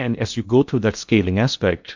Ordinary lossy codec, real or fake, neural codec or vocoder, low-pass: MP3, 64 kbps; fake; codec, 16 kHz in and 24 kHz out, 0.8 kbps, FocalCodec, streaming, 65536 codes; 7.2 kHz